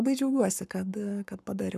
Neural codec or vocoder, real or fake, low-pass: codec, 44.1 kHz, 7.8 kbps, Pupu-Codec; fake; 14.4 kHz